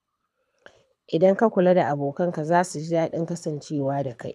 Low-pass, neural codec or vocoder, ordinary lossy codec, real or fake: none; codec, 24 kHz, 6 kbps, HILCodec; none; fake